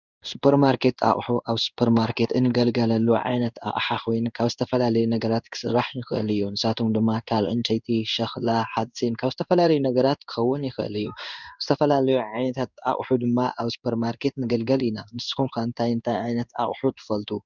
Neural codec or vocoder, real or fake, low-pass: codec, 16 kHz in and 24 kHz out, 1 kbps, XY-Tokenizer; fake; 7.2 kHz